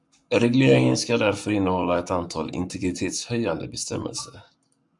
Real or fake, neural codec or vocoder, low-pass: fake; codec, 44.1 kHz, 7.8 kbps, Pupu-Codec; 10.8 kHz